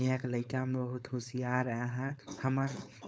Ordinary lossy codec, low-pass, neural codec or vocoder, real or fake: none; none; codec, 16 kHz, 4.8 kbps, FACodec; fake